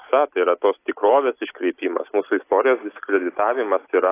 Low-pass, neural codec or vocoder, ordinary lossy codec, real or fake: 3.6 kHz; none; AAC, 24 kbps; real